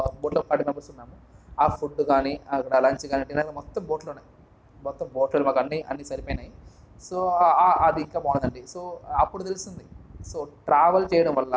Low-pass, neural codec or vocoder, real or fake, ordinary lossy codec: none; none; real; none